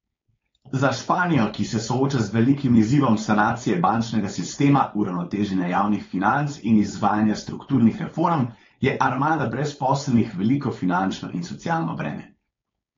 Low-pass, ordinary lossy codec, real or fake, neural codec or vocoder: 7.2 kHz; AAC, 32 kbps; fake; codec, 16 kHz, 4.8 kbps, FACodec